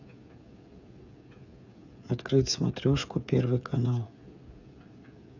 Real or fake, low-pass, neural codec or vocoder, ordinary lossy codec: fake; 7.2 kHz; codec, 16 kHz, 8 kbps, FreqCodec, smaller model; none